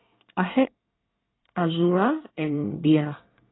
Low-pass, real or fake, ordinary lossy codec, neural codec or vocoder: 7.2 kHz; fake; AAC, 16 kbps; codec, 24 kHz, 1 kbps, SNAC